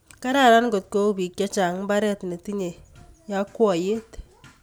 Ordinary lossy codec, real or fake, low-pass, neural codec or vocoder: none; real; none; none